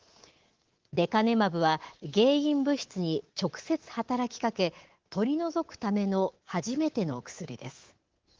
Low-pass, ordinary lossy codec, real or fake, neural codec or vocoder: 7.2 kHz; Opus, 16 kbps; fake; codec, 24 kHz, 3.1 kbps, DualCodec